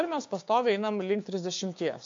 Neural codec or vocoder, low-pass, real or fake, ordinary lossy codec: codec, 16 kHz, 6 kbps, DAC; 7.2 kHz; fake; MP3, 48 kbps